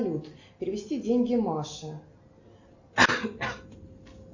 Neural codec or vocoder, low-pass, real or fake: none; 7.2 kHz; real